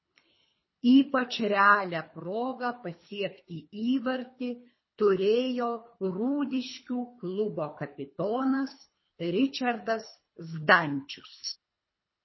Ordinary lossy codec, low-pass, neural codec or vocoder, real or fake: MP3, 24 kbps; 7.2 kHz; codec, 24 kHz, 3 kbps, HILCodec; fake